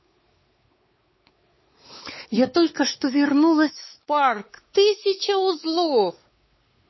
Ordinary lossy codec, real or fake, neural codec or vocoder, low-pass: MP3, 24 kbps; fake; codec, 16 kHz, 4 kbps, X-Codec, WavLM features, trained on Multilingual LibriSpeech; 7.2 kHz